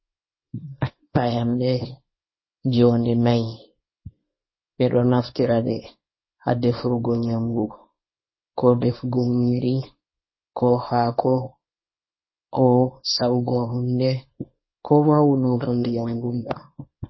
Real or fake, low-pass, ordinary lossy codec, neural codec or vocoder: fake; 7.2 kHz; MP3, 24 kbps; codec, 24 kHz, 0.9 kbps, WavTokenizer, small release